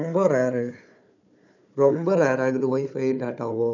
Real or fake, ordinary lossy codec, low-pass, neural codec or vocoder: fake; none; 7.2 kHz; codec, 16 kHz, 4 kbps, FunCodec, trained on Chinese and English, 50 frames a second